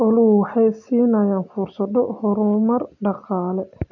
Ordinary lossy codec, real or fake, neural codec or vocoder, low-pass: none; real; none; 7.2 kHz